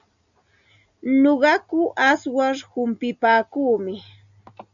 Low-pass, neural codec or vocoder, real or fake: 7.2 kHz; none; real